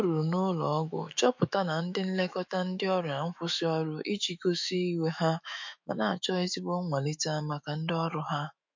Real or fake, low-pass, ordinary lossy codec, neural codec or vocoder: fake; 7.2 kHz; MP3, 48 kbps; autoencoder, 48 kHz, 128 numbers a frame, DAC-VAE, trained on Japanese speech